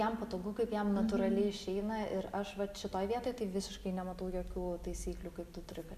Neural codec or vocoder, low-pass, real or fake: none; 14.4 kHz; real